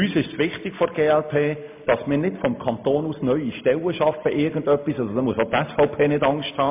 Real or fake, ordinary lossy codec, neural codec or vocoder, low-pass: real; MP3, 32 kbps; none; 3.6 kHz